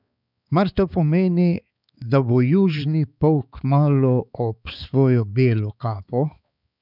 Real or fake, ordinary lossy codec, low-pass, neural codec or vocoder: fake; none; 5.4 kHz; codec, 16 kHz, 4 kbps, X-Codec, HuBERT features, trained on balanced general audio